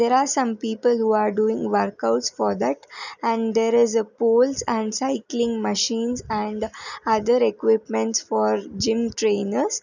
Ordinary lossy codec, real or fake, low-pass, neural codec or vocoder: none; real; 7.2 kHz; none